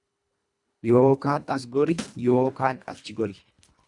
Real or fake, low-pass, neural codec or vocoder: fake; 10.8 kHz; codec, 24 kHz, 1.5 kbps, HILCodec